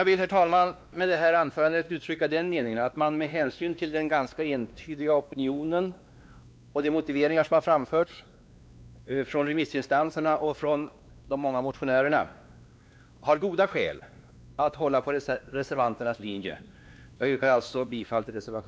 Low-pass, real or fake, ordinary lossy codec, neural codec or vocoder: none; fake; none; codec, 16 kHz, 2 kbps, X-Codec, WavLM features, trained on Multilingual LibriSpeech